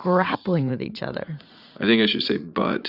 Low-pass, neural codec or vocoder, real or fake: 5.4 kHz; vocoder, 44.1 kHz, 80 mel bands, Vocos; fake